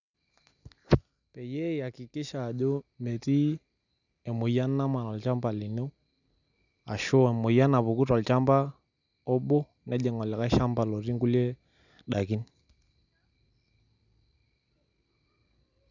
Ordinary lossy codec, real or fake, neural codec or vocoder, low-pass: none; real; none; 7.2 kHz